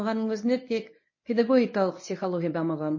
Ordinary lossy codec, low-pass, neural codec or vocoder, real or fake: MP3, 32 kbps; 7.2 kHz; codec, 24 kHz, 0.9 kbps, WavTokenizer, medium speech release version 1; fake